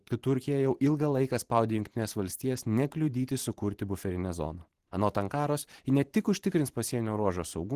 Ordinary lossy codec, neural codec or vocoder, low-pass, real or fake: Opus, 16 kbps; vocoder, 44.1 kHz, 128 mel bands every 512 samples, BigVGAN v2; 14.4 kHz; fake